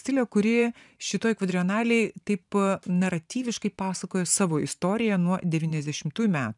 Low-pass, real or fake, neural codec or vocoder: 10.8 kHz; fake; vocoder, 44.1 kHz, 128 mel bands every 512 samples, BigVGAN v2